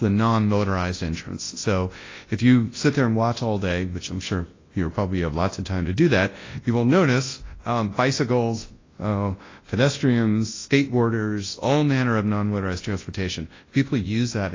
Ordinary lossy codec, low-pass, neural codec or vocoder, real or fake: AAC, 32 kbps; 7.2 kHz; codec, 24 kHz, 0.9 kbps, WavTokenizer, large speech release; fake